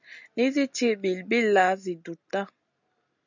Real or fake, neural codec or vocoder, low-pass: real; none; 7.2 kHz